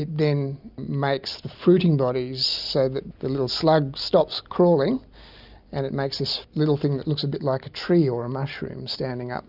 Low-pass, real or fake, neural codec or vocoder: 5.4 kHz; real; none